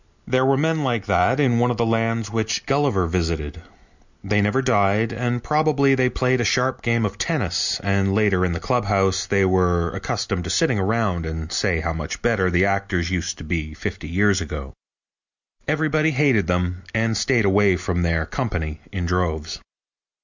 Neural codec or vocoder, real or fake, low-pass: none; real; 7.2 kHz